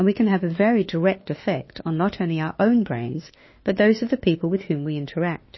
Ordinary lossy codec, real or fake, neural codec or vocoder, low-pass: MP3, 24 kbps; fake; autoencoder, 48 kHz, 32 numbers a frame, DAC-VAE, trained on Japanese speech; 7.2 kHz